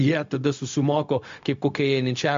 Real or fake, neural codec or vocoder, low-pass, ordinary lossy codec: fake; codec, 16 kHz, 0.4 kbps, LongCat-Audio-Codec; 7.2 kHz; MP3, 48 kbps